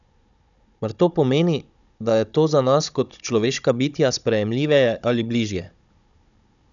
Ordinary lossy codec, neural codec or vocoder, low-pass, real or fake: none; codec, 16 kHz, 16 kbps, FunCodec, trained on Chinese and English, 50 frames a second; 7.2 kHz; fake